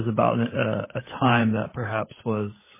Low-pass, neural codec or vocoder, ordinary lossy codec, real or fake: 3.6 kHz; codec, 16 kHz, 16 kbps, FunCodec, trained on LibriTTS, 50 frames a second; MP3, 16 kbps; fake